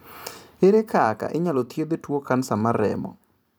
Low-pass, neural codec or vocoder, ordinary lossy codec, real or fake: none; vocoder, 44.1 kHz, 128 mel bands every 256 samples, BigVGAN v2; none; fake